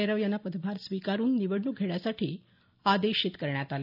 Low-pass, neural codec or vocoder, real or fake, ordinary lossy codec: 5.4 kHz; none; real; none